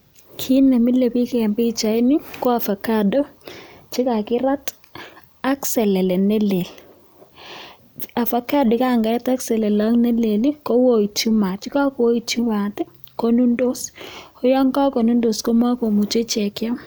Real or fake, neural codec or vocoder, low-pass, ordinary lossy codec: real; none; none; none